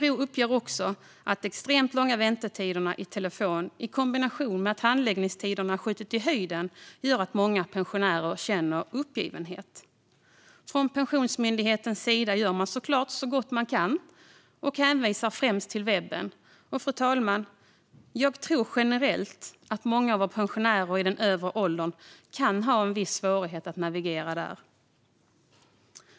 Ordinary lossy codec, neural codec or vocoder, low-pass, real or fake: none; none; none; real